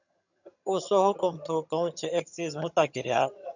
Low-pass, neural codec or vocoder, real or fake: 7.2 kHz; vocoder, 22.05 kHz, 80 mel bands, HiFi-GAN; fake